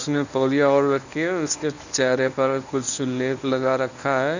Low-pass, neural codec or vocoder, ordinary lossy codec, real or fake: 7.2 kHz; codec, 24 kHz, 0.9 kbps, WavTokenizer, medium speech release version 1; none; fake